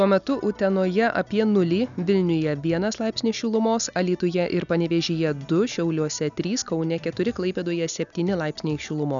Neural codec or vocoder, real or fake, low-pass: none; real; 7.2 kHz